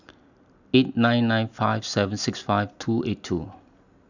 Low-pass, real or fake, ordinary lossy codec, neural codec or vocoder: 7.2 kHz; real; none; none